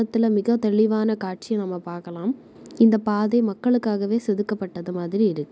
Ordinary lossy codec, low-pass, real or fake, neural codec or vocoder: none; none; real; none